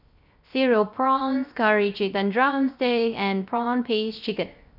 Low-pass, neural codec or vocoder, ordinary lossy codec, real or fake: 5.4 kHz; codec, 16 kHz, 0.3 kbps, FocalCodec; none; fake